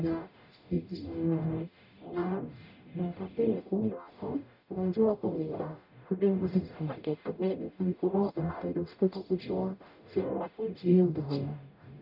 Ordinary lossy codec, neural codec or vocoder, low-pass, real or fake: none; codec, 44.1 kHz, 0.9 kbps, DAC; 5.4 kHz; fake